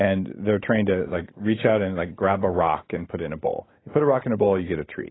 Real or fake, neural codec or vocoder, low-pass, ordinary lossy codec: real; none; 7.2 kHz; AAC, 16 kbps